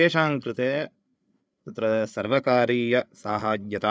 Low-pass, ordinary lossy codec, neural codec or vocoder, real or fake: none; none; codec, 16 kHz, 8 kbps, FreqCodec, larger model; fake